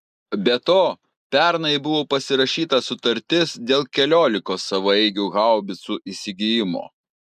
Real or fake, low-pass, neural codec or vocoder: real; 14.4 kHz; none